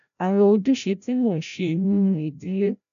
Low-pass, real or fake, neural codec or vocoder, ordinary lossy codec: 7.2 kHz; fake; codec, 16 kHz, 0.5 kbps, FreqCodec, larger model; none